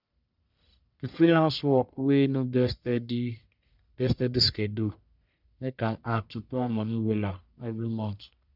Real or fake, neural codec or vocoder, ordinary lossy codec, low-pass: fake; codec, 44.1 kHz, 1.7 kbps, Pupu-Codec; none; 5.4 kHz